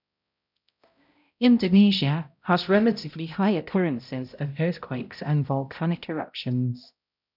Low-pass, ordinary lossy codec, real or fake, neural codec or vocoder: 5.4 kHz; none; fake; codec, 16 kHz, 0.5 kbps, X-Codec, HuBERT features, trained on balanced general audio